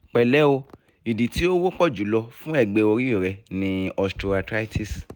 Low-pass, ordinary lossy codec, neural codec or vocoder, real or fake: none; none; none; real